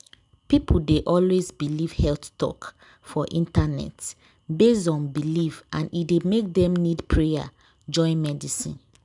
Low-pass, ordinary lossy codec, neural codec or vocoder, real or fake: 10.8 kHz; none; none; real